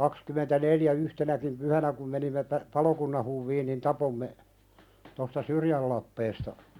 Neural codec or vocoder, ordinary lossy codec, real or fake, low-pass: none; none; real; 19.8 kHz